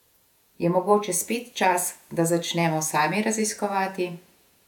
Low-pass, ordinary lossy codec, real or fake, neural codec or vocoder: 19.8 kHz; none; fake; vocoder, 48 kHz, 128 mel bands, Vocos